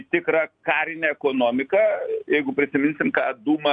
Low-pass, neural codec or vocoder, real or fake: 9.9 kHz; none; real